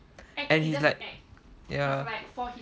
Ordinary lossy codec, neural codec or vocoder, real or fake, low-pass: none; none; real; none